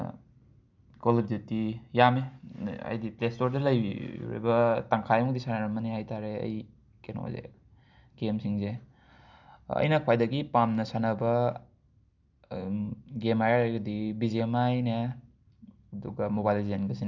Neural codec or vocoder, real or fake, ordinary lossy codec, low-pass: none; real; none; 7.2 kHz